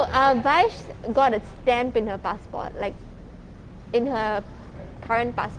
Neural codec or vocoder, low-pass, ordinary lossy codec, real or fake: none; 9.9 kHz; Opus, 16 kbps; real